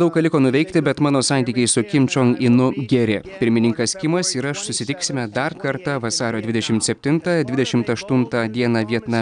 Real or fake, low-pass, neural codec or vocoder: real; 9.9 kHz; none